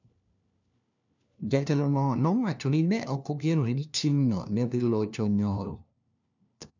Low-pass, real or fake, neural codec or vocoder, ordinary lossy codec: 7.2 kHz; fake; codec, 16 kHz, 1 kbps, FunCodec, trained on LibriTTS, 50 frames a second; none